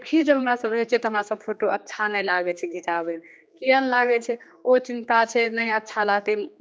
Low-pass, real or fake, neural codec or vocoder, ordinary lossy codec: none; fake; codec, 16 kHz, 2 kbps, X-Codec, HuBERT features, trained on general audio; none